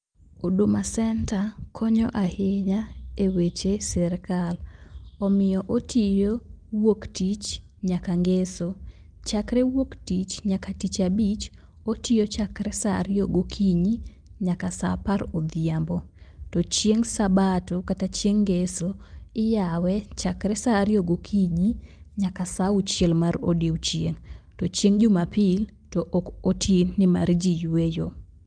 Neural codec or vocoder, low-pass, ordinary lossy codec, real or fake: none; 9.9 kHz; Opus, 32 kbps; real